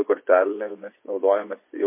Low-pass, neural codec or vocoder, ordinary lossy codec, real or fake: 3.6 kHz; vocoder, 24 kHz, 100 mel bands, Vocos; MP3, 24 kbps; fake